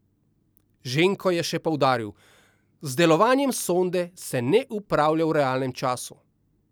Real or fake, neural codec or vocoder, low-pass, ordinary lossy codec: real; none; none; none